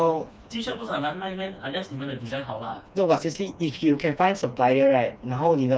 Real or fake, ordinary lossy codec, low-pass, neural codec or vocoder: fake; none; none; codec, 16 kHz, 2 kbps, FreqCodec, smaller model